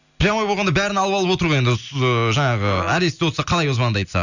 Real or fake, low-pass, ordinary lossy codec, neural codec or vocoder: real; 7.2 kHz; none; none